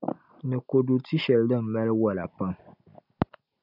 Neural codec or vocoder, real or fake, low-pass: none; real; 5.4 kHz